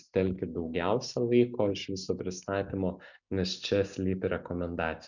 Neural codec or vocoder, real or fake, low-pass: none; real; 7.2 kHz